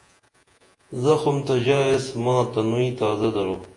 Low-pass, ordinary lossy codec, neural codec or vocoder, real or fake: 10.8 kHz; AAC, 48 kbps; vocoder, 48 kHz, 128 mel bands, Vocos; fake